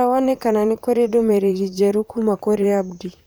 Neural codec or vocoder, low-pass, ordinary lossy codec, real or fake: vocoder, 44.1 kHz, 128 mel bands, Pupu-Vocoder; none; none; fake